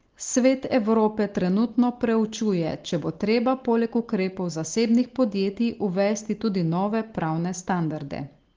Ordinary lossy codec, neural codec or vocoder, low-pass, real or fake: Opus, 16 kbps; none; 7.2 kHz; real